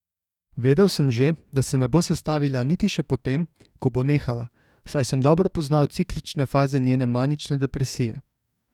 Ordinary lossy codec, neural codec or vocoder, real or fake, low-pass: none; codec, 44.1 kHz, 2.6 kbps, DAC; fake; 19.8 kHz